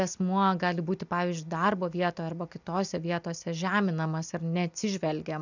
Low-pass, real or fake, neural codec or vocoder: 7.2 kHz; real; none